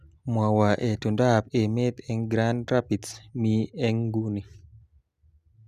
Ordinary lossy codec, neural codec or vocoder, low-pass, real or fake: Opus, 64 kbps; none; 14.4 kHz; real